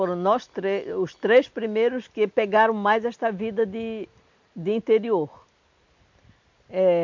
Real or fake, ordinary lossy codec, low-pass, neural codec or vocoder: real; MP3, 48 kbps; 7.2 kHz; none